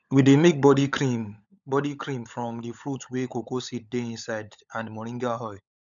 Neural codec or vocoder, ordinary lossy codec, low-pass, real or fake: codec, 16 kHz, 16 kbps, FunCodec, trained on LibriTTS, 50 frames a second; none; 7.2 kHz; fake